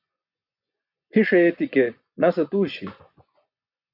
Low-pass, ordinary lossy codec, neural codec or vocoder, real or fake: 5.4 kHz; AAC, 48 kbps; vocoder, 24 kHz, 100 mel bands, Vocos; fake